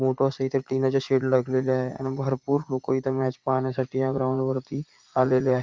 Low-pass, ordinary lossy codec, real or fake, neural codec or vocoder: 7.2 kHz; Opus, 32 kbps; fake; vocoder, 44.1 kHz, 80 mel bands, Vocos